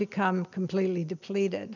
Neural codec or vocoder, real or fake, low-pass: none; real; 7.2 kHz